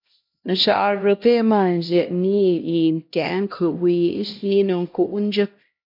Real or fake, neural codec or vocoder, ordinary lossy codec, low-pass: fake; codec, 16 kHz, 1 kbps, X-Codec, HuBERT features, trained on LibriSpeech; MP3, 48 kbps; 5.4 kHz